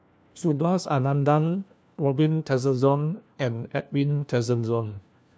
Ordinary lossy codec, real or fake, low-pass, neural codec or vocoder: none; fake; none; codec, 16 kHz, 1 kbps, FunCodec, trained on LibriTTS, 50 frames a second